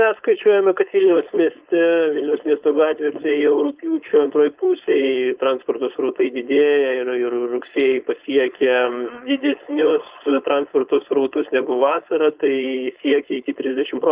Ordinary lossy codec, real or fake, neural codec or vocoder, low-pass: Opus, 24 kbps; fake; codec, 16 kHz, 4.8 kbps, FACodec; 3.6 kHz